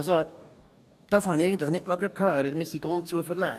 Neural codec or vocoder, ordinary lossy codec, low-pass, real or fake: codec, 44.1 kHz, 2.6 kbps, DAC; none; 14.4 kHz; fake